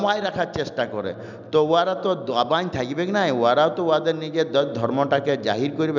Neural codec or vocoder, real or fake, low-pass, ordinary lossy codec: none; real; 7.2 kHz; none